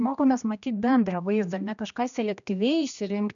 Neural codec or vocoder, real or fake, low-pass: codec, 16 kHz, 1 kbps, X-Codec, HuBERT features, trained on general audio; fake; 7.2 kHz